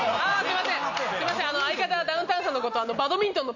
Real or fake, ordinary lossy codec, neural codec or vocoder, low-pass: real; none; none; 7.2 kHz